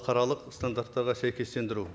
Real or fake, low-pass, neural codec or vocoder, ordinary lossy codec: real; none; none; none